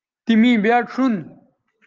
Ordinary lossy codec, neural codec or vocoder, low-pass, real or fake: Opus, 32 kbps; none; 7.2 kHz; real